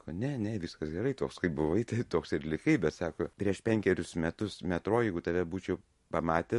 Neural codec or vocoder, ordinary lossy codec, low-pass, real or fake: none; MP3, 48 kbps; 14.4 kHz; real